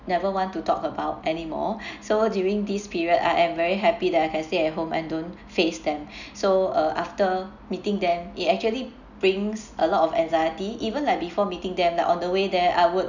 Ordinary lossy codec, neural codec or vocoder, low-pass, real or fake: none; none; 7.2 kHz; real